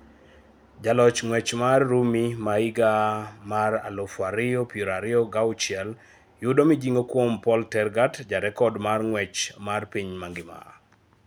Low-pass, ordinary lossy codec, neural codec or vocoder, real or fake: none; none; none; real